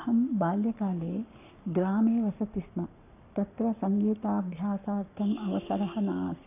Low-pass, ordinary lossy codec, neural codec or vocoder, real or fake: 3.6 kHz; none; codec, 44.1 kHz, 7.8 kbps, Pupu-Codec; fake